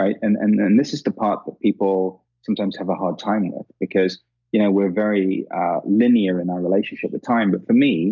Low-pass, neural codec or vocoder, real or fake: 7.2 kHz; none; real